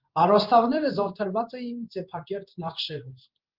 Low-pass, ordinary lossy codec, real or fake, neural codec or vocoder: 5.4 kHz; Opus, 32 kbps; fake; codec, 16 kHz in and 24 kHz out, 1 kbps, XY-Tokenizer